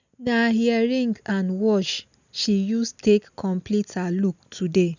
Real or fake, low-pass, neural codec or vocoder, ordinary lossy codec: real; 7.2 kHz; none; none